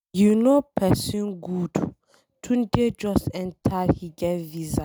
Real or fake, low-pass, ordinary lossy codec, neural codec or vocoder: real; none; none; none